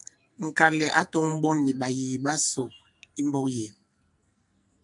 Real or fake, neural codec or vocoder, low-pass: fake; codec, 44.1 kHz, 2.6 kbps, SNAC; 10.8 kHz